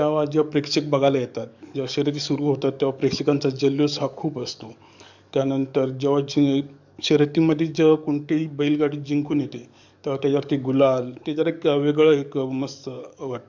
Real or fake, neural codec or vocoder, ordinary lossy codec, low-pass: fake; codec, 44.1 kHz, 7.8 kbps, DAC; none; 7.2 kHz